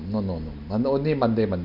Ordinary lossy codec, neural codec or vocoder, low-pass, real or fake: none; none; 5.4 kHz; real